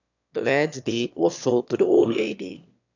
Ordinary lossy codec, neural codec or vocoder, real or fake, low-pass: none; autoencoder, 22.05 kHz, a latent of 192 numbers a frame, VITS, trained on one speaker; fake; 7.2 kHz